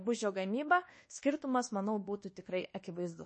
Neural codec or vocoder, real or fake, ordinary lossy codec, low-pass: codec, 24 kHz, 1.2 kbps, DualCodec; fake; MP3, 32 kbps; 10.8 kHz